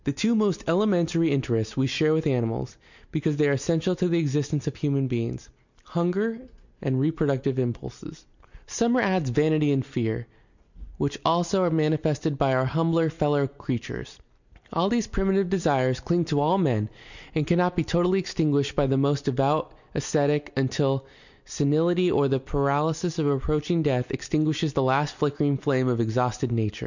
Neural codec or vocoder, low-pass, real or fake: none; 7.2 kHz; real